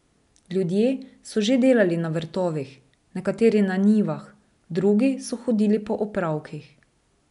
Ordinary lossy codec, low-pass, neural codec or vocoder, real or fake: none; 10.8 kHz; none; real